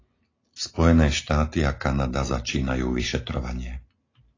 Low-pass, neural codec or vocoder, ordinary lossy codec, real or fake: 7.2 kHz; none; AAC, 32 kbps; real